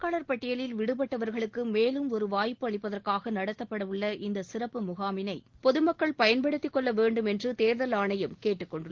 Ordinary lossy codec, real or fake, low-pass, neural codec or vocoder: Opus, 16 kbps; real; 7.2 kHz; none